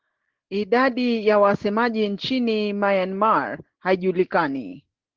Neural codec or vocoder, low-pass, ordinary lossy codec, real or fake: none; 7.2 kHz; Opus, 16 kbps; real